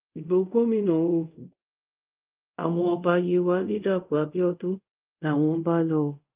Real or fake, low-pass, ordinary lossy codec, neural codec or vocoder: fake; 3.6 kHz; Opus, 24 kbps; codec, 24 kHz, 0.5 kbps, DualCodec